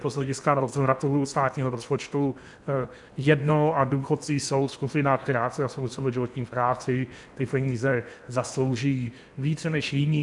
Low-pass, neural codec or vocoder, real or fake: 10.8 kHz; codec, 16 kHz in and 24 kHz out, 0.8 kbps, FocalCodec, streaming, 65536 codes; fake